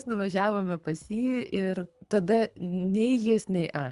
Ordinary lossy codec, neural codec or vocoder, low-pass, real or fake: Opus, 64 kbps; codec, 24 kHz, 3 kbps, HILCodec; 10.8 kHz; fake